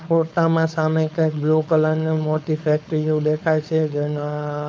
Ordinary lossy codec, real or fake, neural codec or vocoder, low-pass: none; fake; codec, 16 kHz, 4.8 kbps, FACodec; none